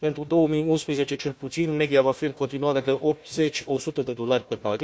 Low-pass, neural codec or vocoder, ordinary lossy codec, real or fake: none; codec, 16 kHz, 1 kbps, FunCodec, trained on Chinese and English, 50 frames a second; none; fake